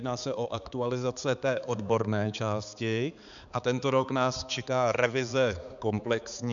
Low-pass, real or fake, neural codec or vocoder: 7.2 kHz; fake; codec, 16 kHz, 4 kbps, X-Codec, HuBERT features, trained on balanced general audio